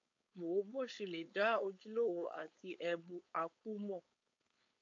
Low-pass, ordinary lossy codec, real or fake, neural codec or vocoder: 7.2 kHz; AAC, 48 kbps; fake; codec, 16 kHz, 4.8 kbps, FACodec